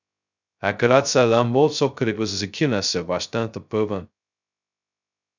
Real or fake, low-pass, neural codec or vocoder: fake; 7.2 kHz; codec, 16 kHz, 0.2 kbps, FocalCodec